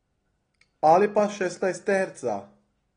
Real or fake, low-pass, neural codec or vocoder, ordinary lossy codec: real; 9.9 kHz; none; AAC, 32 kbps